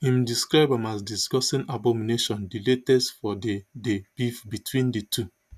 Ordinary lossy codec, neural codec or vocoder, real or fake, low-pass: none; none; real; 14.4 kHz